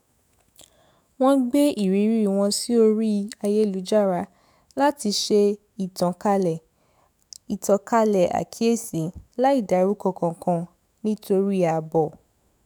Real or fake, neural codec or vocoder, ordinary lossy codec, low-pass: fake; autoencoder, 48 kHz, 128 numbers a frame, DAC-VAE, trained on Japanese speech; none; none